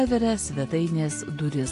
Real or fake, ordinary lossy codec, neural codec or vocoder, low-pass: real; AAC, 48 kbps; none; 10.8 kHz